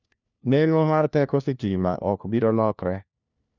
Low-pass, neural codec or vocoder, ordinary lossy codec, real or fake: 7.2 kHz; codec, 16 kHz, 1 kbps, FunCodec, trained on LibriTTS, 50 frames a second; none; fake